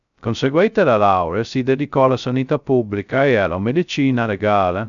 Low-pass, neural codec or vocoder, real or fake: 7.2 kHz; codec, 16 kHz, 0.2 kbps, FocalCodec; fake